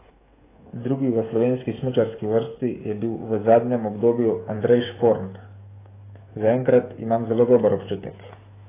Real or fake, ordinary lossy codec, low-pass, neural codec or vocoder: fake; AAC, 16 kbps; 3.6 kHz; codec, 16 kHz, 16 kbps, FreqCodec, smaller model